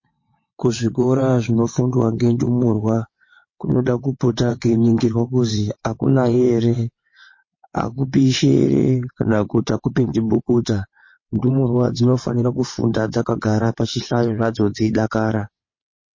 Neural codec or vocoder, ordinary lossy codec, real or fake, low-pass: vocoder, 22.05 kHz, 80 mel bands, WaveNeXt; MP3, 32 kbps; fake; 7.2 kHz